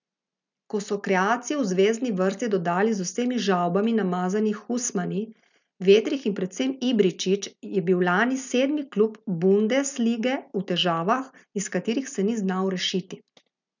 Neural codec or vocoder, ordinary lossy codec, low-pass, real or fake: none; none; 7.2 kHz; real